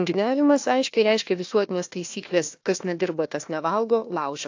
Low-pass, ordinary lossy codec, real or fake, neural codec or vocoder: 7.2 kHz; AAC, 48 kbps; fake; codec, 16 kHz, 1 kbps, FunCodec, trained on Chinese and English, 50 frames a second